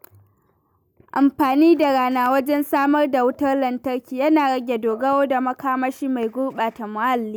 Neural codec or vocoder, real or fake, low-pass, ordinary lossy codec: none; real; none; none